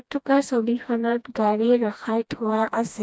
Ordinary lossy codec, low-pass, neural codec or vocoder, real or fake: none; none; codec, 16 kHz, 1 kbps, FreqCodec, smaller model; fake